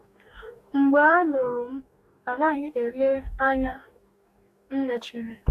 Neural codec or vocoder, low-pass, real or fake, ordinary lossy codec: codec, 44.1 kHz, 2.6 kbps, DAC; 14.4 kHz; fake; none